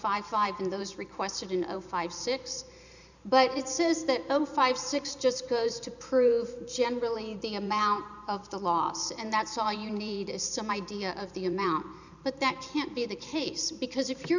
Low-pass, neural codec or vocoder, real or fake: 7.2 kHz; vocoder, 44.1 kHz, 128 mel bands every 512 samples, BigVGAN v2; fake